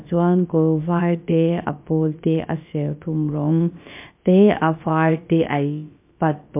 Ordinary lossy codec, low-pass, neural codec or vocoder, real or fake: MP3, 32 kbps; 3.6 kHz; codec, 16 kHz, about 1 kbps, DyCAST, with the encoder's durations; fake